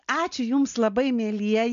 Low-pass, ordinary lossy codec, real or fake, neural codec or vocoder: 7.2 kHz; MP3, 64 kbps; real; none